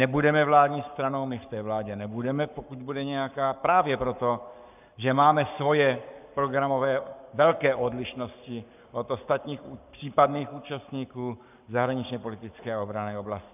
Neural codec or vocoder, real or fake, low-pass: codec, 44.1 kHz, 7.8 kbps, Pupu-Codec; fake; 3.6 kHz